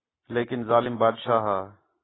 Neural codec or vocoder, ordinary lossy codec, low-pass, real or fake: none; AAC, 16 kbps; 7.2 kHz; real